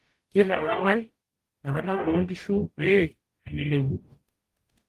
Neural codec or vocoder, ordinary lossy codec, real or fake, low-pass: codec, 44.1 kHz, 0.9 kbps, DAC; Opus, 24 kbps; fake; 14.4 kHz